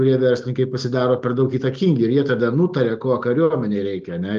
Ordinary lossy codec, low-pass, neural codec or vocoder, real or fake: Opus, 24 kbps; 7.2 kHz; none; real